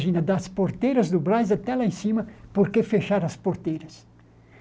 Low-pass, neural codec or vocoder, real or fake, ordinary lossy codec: none; none; real; none